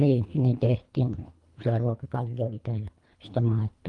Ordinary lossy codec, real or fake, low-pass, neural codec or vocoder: Opus, 32 kbps; fake; 10.8 kHz; codec, 24 kHz, 3 kbps, HILCodec